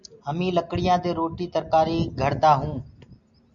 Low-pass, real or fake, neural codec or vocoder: 7.2 kHz; real; none